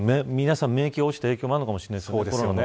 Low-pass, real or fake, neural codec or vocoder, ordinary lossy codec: none; real; none; none